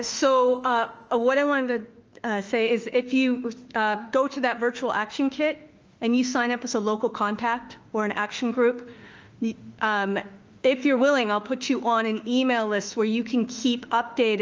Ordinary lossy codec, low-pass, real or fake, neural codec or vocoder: Opus, 24 kbps; 7.2 kHz; fake; autoencoder, 48 kHz, 32 numbers a frame, DAC-VAE, trained on Japanese speech